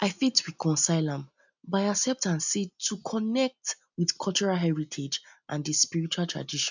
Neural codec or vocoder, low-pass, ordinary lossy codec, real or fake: none; 7.2 kHz; none; real